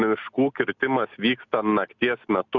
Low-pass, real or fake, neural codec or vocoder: 7.2 kHz; real; none